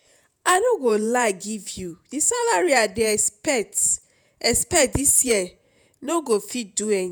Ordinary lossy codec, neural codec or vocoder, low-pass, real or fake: none; vocoder, 48 kHz, 128 mel bands, Vocos; none; fake